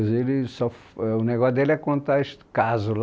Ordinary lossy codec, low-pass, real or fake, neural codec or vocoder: none; none; real; none